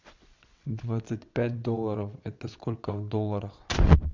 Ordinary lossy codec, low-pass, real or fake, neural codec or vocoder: MP3, 64 kbps; 7.2 kHz; fake; vocoder, 22.05 kHz, 80 mel bands, WaveNeXt